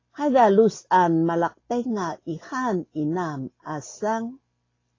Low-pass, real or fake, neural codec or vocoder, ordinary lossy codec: 7.2 kHz; real; none; AAC, 32 kbps